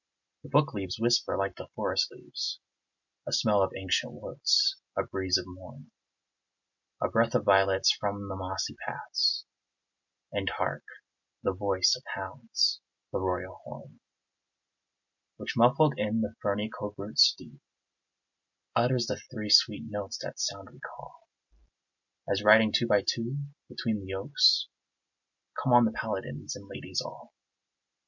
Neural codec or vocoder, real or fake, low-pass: none; real; 7.2 kHz